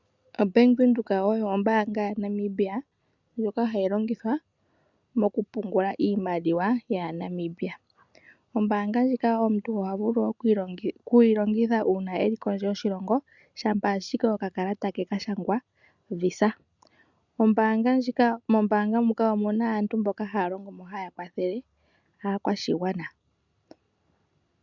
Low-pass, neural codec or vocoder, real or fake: 7.2 kHz; none; real